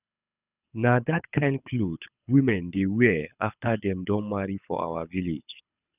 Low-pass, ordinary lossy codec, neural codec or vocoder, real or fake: 3.6 kHz; none; codec, 24 kHz, 6 kbps, HILCodec; fake